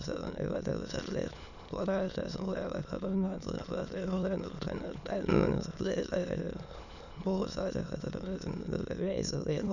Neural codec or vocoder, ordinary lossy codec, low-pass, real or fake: autoencoder, 22.05 kHz, a latent of 192 numbers a frame, VITS, trained on many speakers; none; 7.2 kHz; fake